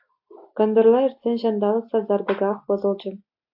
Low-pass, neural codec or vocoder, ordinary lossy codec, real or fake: 5.4 kHz; none; AAC, 48 kbps; real